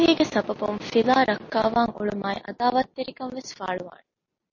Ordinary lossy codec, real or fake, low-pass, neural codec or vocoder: MP3, 32 kbps; real; 7.2 kHz; none